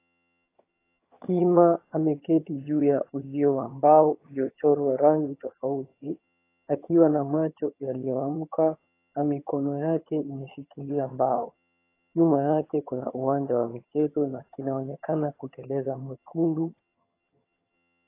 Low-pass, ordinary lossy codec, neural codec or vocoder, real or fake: 3.6 kHz; AAC, 24 kbps; vocoder, 22.05 kHz, 80 mel bands, HiFi-GAN; fake